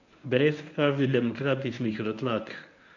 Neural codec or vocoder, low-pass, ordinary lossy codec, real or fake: codec, 24 kHz, 0.9 kbps, WavTokenizer, medium speech release version 1; 7.2 kHz; none; fake